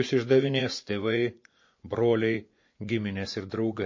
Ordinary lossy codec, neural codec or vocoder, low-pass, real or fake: MP3, 32 kbps; vocoder, 24 kHz, 100 mel bands, Vocos; 7.2 kHz; fake